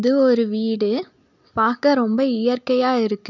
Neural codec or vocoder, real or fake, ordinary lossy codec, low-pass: none; real; none; 7.2 kHz